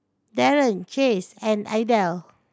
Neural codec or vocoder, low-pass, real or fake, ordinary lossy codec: none; none; real; none